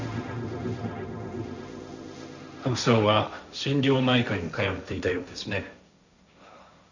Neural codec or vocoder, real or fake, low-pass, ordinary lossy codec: codec, 16 kHz, 1.1 kbps, Voila-Tokenizer; fake; 7.2 kHz; none